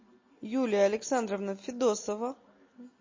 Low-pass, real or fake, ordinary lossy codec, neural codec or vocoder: 7.2 kHz; real; MP3, 32 kbps; none